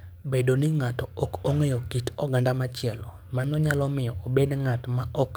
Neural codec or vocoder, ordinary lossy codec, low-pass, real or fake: codec, 44.1 kHz, 7.8 kbps, DAC; none; none; fake